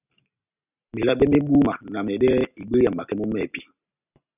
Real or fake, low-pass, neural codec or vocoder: real; 3.6 kHz; none